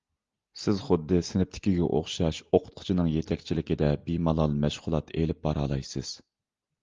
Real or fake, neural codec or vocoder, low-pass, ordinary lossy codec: real; none; 7.2 kHz; Opus, 32 kbps